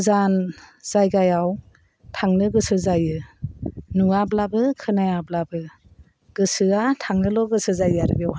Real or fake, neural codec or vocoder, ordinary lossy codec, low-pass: real; none; none; none